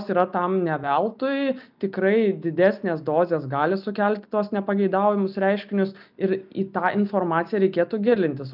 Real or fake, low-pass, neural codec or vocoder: real; 5.4 kHz; none